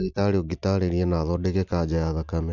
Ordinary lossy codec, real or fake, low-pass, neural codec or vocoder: none; real; 7.2 kHz; none